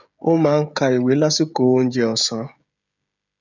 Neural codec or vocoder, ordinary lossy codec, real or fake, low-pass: codec, 16 kHz, 16 kbps, FreqCodec, smaller model; none; fake; 7.2 kHz